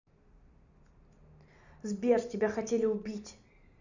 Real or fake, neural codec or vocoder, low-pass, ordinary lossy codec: real; none; 7.2 kHz; none